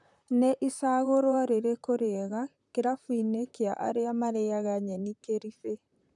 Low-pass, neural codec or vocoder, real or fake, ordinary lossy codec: 10.8 kHz; vocoder, 44.1 kHz, 128 mel bands, Pupu-Vocoder; fake; none